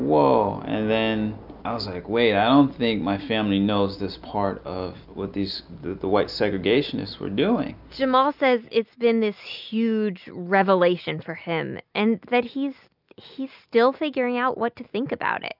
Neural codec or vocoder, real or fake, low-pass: none; real; 5.4 kHz